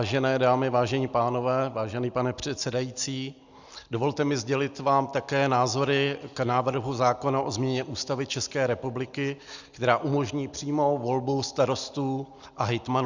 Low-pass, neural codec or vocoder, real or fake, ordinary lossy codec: 7.2 kHz; none; real; Opus, 64 kbps